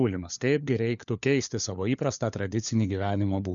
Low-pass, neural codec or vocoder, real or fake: 7.2 kHz; codec, 16 kHz, 4 kbps, FunCodec, trained on LibriTTS, 50 frames a second; fake